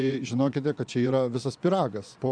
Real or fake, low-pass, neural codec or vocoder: fake; 9.9 kHz; vocoder, 24 kHz, 100 mel bands, Vocos